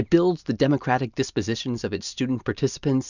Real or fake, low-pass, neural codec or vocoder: real; 7.2 kHz; none